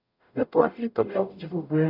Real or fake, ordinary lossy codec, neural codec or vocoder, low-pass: fake; none; codec, 44.1 kHz, 0.9 kbps, DAC; 5.4 kHz